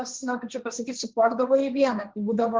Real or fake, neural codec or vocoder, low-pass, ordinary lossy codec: fake; codec, 16 kHz, 1.1 kbps, Voila-Tokenizer; 7.2 kHz; Opus, 16 kbps